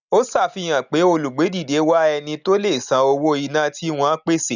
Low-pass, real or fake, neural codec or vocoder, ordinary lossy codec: 7.2 kHz; real; none; none